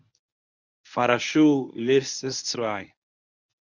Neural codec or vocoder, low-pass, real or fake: codec, 24 kHz, 0.9 kbps, WavTokenizer, medium speech release version 1; 7.2 kHz; fake